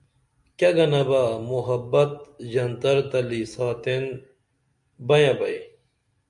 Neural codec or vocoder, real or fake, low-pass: none; real; 10.8 kHz